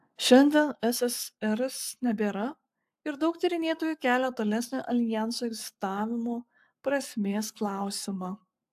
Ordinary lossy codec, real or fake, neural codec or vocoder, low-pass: AAC, 96 kbps; fake; codec, 44.1 kHz, 7.8 kbps, Pupu-Codec; 14.4 kHz